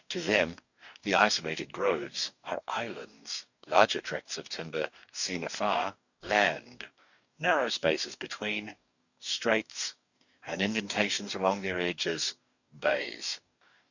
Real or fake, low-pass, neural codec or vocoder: fake; 7.2 kHz; codec, 44.1 kHz, 2.6 kbps, DAC